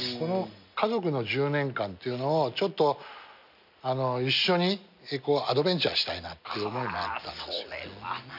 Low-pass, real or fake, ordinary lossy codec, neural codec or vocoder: 5.4 kHz; real; none; none